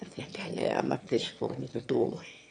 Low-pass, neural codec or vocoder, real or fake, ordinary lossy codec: 9.9 kHz; autoencoder, 22.05 kHz, a latent of 192 numbers a frame, VITS, trained on one speaker; fake; AAC, 64 kbps